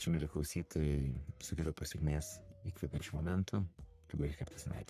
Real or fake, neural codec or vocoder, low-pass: fake; codec, 44.1 kHz, 3.4 kbps, Pupu-Codec; 14.4 kHz